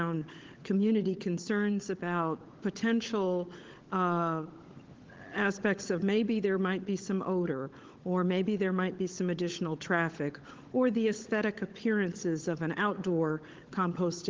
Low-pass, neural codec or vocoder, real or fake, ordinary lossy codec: 7.2 kHz; codec, 16 kHz, 16 kbps, FunCodec, trained on LibriTTS, 50 frames a second; fake; Opus, 16 kbps